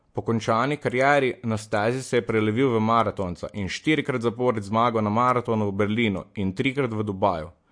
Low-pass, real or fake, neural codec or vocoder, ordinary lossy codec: 9.9 kHz; real; none; MP3, 48 kbps